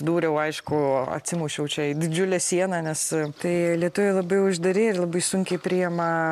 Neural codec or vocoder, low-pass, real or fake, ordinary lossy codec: none; 14.4 kHz; real; MP3, 96 kbps